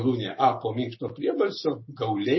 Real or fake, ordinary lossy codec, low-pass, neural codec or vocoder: real; MP3, 24 kbps; 7.2 kHz; none